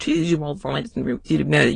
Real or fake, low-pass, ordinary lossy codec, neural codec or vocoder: fake; 9.9 kHz; AAC, 32 kbps; autoencoder, 22.05 kHz, a latent of 192 numbers a frame, VITS, trained on many speakers